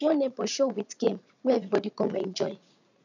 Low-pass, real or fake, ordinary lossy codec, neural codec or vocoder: 7.2 kHz; fake; none; vocoder, 44.1 kHz, 80 mel bands, Vocos